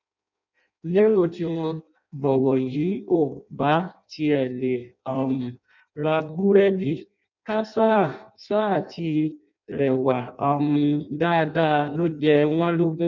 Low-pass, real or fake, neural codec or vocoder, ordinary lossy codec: 7.2 kHz; fake; codec, 16 kHz in and 24 kHz out, 0.6 kbps, FireRedTTS-2 codec; none